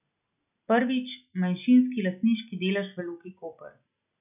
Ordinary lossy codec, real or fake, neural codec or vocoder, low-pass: none; real; none; 3.6 kHz